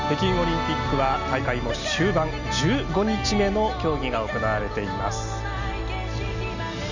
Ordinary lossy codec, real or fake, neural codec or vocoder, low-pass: none; real; none; 7.2 kHz